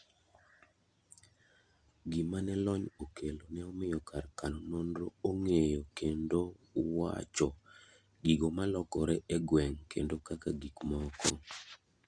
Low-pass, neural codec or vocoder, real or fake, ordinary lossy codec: 9.9 kHz; none; real; Opus, 64 kbps